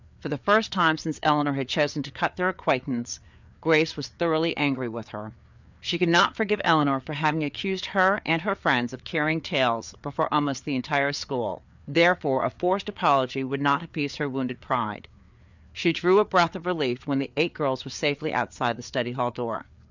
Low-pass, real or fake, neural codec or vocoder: 7.2 kHz; fake; codec, 16 kHz, 4 kbps, FreqCodec, larger model